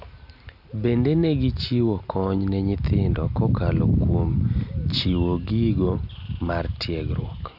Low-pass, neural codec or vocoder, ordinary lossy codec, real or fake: 5.4 kHz; none; none; real